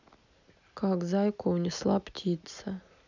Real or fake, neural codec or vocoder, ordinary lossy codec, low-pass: real; none; none; 7.2 kHz